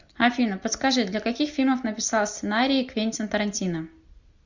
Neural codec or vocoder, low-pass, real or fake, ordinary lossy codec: none; 7.2 kHz; real; Opus, 64 kbps